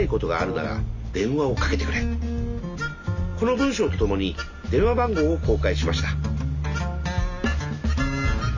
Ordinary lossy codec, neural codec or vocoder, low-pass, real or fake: none; none; 7.2 kHz; real